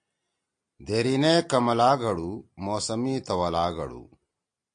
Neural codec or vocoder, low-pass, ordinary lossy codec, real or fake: none; 9.9 kHz; AAC, 64 kbps; real